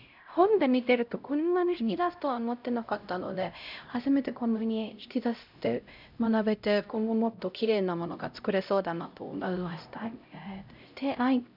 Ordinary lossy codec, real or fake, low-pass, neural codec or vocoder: none; fake; 5.4 kHz; codec, 16 kHz, 0.5 kbps, X-Codec, HuBERT features, trained on LibriSpeech